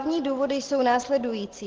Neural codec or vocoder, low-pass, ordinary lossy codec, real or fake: none; 7.2 kHz; Opus, 16 kbps; real